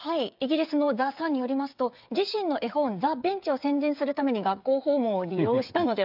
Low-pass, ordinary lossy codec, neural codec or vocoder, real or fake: 5.4 kHz; AAC, 48 kbps; codec, 16 kHz, 8 kbps, FreqCodec, smaller model; fake